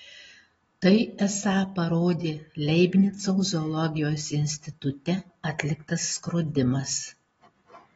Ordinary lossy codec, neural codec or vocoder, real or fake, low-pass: AAC, 24 kbps; none; real; 9.9 kHz